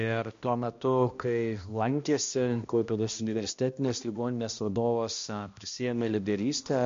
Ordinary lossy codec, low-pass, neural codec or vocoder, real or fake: MP3, 48 kbps; 7.2 kHz; codec, 16 kHz, 1 kbps, X-Codec, HuBERT features, trained on balanced general audio; fake